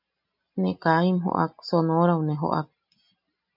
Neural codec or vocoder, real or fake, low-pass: none; real; 5.4 kHz